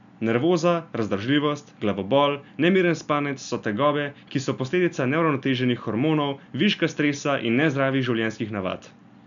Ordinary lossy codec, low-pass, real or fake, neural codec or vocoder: none; 7.2 kHz; real; none